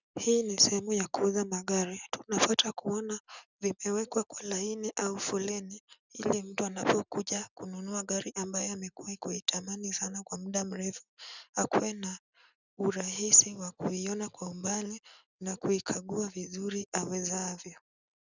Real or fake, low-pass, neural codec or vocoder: real; 7.2 kHz; none